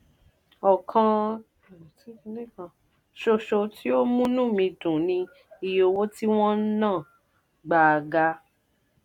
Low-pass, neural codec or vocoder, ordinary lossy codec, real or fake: 19.8 kHz; none; none; real